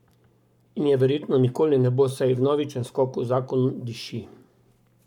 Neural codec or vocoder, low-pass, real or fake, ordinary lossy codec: codec, 44.1 kHz, 7.8 kbps, Pupu-Codec; 19.8 kHz; fake; none